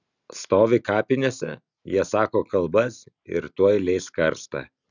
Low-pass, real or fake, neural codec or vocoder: 7.2 kHz; real; none